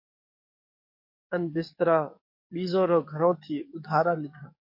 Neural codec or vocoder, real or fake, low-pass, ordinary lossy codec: codec, 44.1 kHz, 7.8 kbps, DAC; fake; 5.4 kHz; MP3, 32 kbps